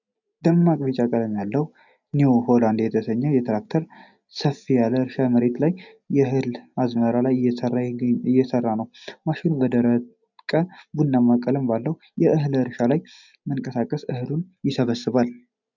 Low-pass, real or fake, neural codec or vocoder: 7.2 kHz; real; none